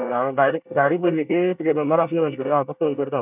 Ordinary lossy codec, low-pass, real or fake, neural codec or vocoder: none; 3.6 kHz; fake; codec, 24 kHz, 1 kbps, SNAC